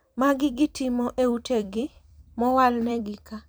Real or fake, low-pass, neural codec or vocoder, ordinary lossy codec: fake; none; vocoder, 44.1 kHz, 128 mel bands every 256 samples, BigVGAN v2; none